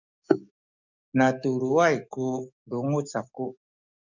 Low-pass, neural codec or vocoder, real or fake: 7.2 kHz; codec, 44.1 kHz, 7.8 kbps, DAC; fake